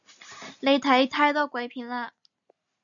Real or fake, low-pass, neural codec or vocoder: real; 7.2 kHz; none